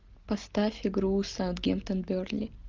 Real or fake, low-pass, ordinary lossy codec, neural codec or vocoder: real; 7.2 kHz; Opus, 32 kbps; none